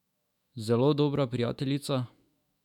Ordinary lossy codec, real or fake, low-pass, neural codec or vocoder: none; fake; 19.8 kHz; autoencoder, 48 kHz, 128 numbers a frame, DAC-VAE, trained on Japanese speech